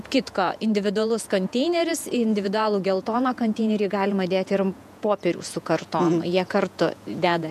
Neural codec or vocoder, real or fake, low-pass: vocoder, 48 kHz, 128 mel bands, Vocos; fake; 14.4 kHz